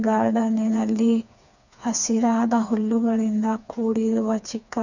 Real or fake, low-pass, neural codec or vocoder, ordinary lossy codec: fake; 7.2 kHz; codec, 16 kHz, 4 kbps, FreqCodec, smaller model; none